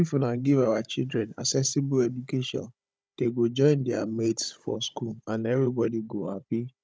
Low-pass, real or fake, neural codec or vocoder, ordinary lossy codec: none; fake; codec, 16 kHz, 16 kbps, FunCodec, trained on Chinese and English, 50 frames a second; none